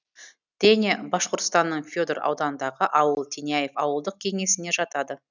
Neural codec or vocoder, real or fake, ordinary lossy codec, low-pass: none; real; none; 7.2 kHz